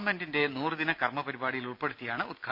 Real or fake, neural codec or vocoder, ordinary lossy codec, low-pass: real; none; MP3, 48 kbps; 5.4 kHz